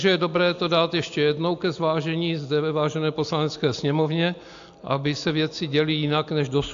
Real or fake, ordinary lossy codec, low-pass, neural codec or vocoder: real; AAC, 64 kbps; 7.2 kHz; none